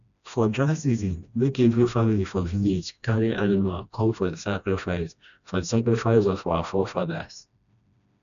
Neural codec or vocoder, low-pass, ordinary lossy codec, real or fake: codec, 16 kHz, 1 kbps, FreqCodec, smaller model; 7.2 kHz; none; fake